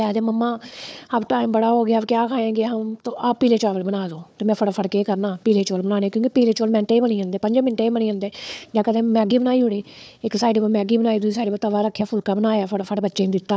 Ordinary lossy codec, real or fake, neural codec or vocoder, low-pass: none; fake; codec, 16 kHz, 4 kbps, FunCodec, trained on Chinese and English, 50 frames a second; none